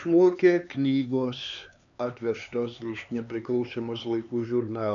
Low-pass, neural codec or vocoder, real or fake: 7.2 kHz; codec, 16 kHz, 4 kbps, X-Codec, HuBERT features, trained on LibriSpeech; fake